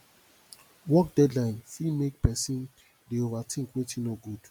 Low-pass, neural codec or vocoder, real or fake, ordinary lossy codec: 19.8 kHz; none; real; MP3, 96 kbps